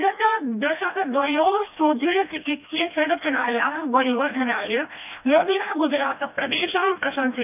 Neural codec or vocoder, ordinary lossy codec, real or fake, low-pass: codec, 16 kHz, 1 kbps, FreqCodec, smaller model; none; fake; 3.6 kHz